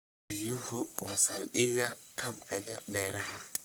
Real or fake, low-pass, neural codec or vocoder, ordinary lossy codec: fake; none; codec, 44.1 kHz, 1.7 kbps, Pupu-Codec; none